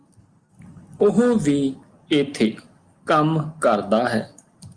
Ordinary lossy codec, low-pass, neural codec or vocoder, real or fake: Opus, 32 kbps; 9.9 kHz; none; real